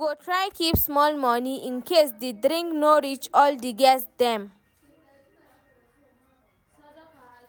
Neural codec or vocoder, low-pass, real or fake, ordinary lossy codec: none; none; real; none